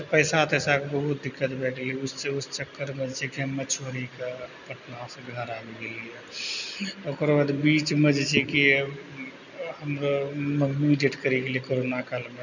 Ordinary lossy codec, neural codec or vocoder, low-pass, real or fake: none; none; 7.2 kHz; real